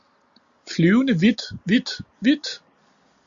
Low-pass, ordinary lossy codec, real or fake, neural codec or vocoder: 7.2 kHz; Opus, 64 kbps; real; none